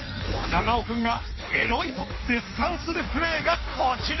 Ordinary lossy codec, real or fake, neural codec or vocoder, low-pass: MP3, 24 kbps; fake; codec, 16 kHz in and 24 kHz out, 1.1 kbps, FireRedTTS-2 codec; 7.2 kHz